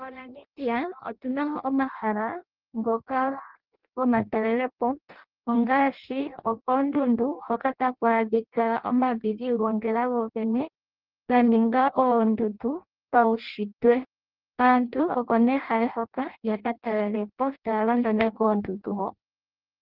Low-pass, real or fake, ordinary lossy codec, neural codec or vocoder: 5.4 kHz; fake; Opus, 16 kbps; codec, 16 kHz in and 24 kHz out, 0.6 kbps, FireRedTTS-2 codec